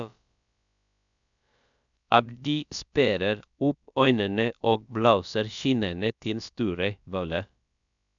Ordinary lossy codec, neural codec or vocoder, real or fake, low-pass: none; codec, 16 kHz, about 1 kbps, DyCAST, with the encoder's durations; fake; 7.2 kHz